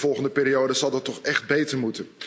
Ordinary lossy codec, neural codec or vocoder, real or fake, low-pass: none; none; real; none